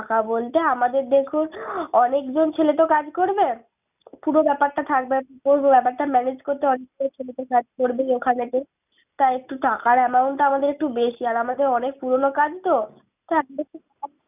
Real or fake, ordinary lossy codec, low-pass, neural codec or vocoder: real; none; 3.6 kHz; none